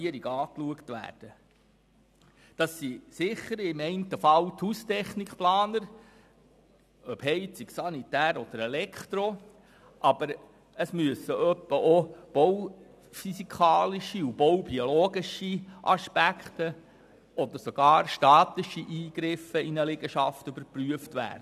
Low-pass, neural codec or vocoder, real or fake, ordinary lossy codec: 14.4 kHz; none; real; none